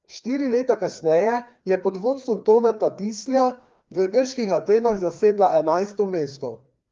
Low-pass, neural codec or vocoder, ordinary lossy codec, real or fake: 7.2 kHz; codec, 16 kHz, 2 kbps, FreqCodec, larger model; Opus, 32 kbps; fake